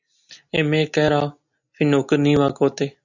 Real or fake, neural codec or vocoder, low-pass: real; none; 7.2 kHz